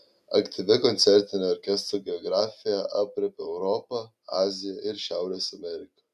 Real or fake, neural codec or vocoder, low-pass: real; none; 14.4 kHz